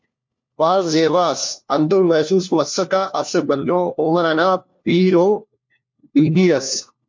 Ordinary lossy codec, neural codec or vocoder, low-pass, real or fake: MP3, 48 kbps; codec, 16 kHz, 1 kbps, FunCodec, trained on LibriTTS, 50 frames a second; 7.2 kHz; fake